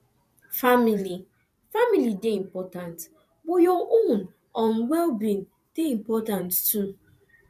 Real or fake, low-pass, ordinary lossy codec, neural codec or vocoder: fake; 14.4 kHz; none; vocoder, 44.1 kHz, 128 mel bands every 512 samples, BigVGAN v2